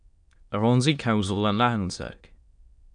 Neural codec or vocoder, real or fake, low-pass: autoencoder, 22.05 kHz, a latent of 192 numbers a frame, VITS, trained on many speakers; fake; 9.9 kHz